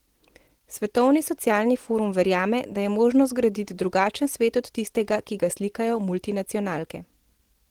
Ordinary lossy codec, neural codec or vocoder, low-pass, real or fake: Opus, 16 kbps; none; 19.8 kHz; real